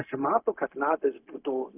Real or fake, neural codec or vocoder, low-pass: fake; codec, 16 kHz, 0.4 kbps, LongCat-Audio-Codec; 3.6 kHz